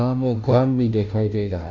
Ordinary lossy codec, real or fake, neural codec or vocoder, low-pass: none; fake; codec, 16 kHz, 0.5 kbps, FunCodec, trained on Chinese and English, 25 frames a second; 7.2 kHz